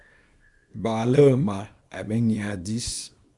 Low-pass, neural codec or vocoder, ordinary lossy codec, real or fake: 10.8 kHz; codec, 24 kHz, 0.9 kbps, WavTokenizer, small release; Opus, 64 kbps; fake